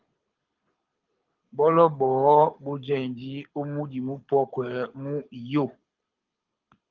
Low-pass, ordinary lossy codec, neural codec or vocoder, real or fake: 7.2 kHz; Opus, 32 kbps; codec, 24 kHz, 6 kbps, HILCodec; fake